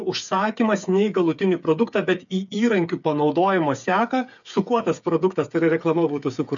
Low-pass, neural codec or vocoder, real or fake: 7.2 kHz; codec, 16 kHz, 8 kbps, FreqCodec, smaller model; fake